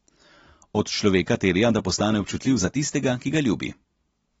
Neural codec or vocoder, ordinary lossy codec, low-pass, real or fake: none; AAC, 24 kbps; 19.8 kHz; real